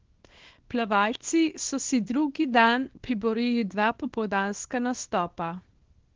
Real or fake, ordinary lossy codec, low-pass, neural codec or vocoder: fake; Opus, 16 kbps; 7.2 kHz; codec, 24 kHz, 0.9 kbps, WavTokenizer, small release